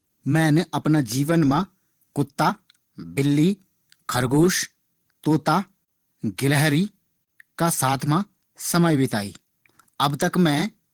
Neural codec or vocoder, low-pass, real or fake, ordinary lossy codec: vocoder, 44.1 kHz, 128 mel bands every 512 samples, BigVGAN v2; 19.8 kHz; fake; Opus, 16 kbps